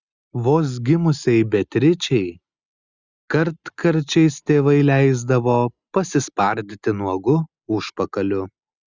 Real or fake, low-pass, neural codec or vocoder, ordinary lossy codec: real; 7.2 kHz; none; Opus, 64 kbps